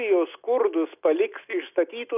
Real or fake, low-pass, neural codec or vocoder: real; 3.6 kHz; none